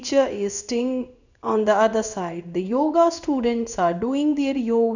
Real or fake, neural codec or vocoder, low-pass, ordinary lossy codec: fake; codec, 16 kHz in and 24 kHz out, 1 kbps, XY-Tokenizer; 7.2 kHz; none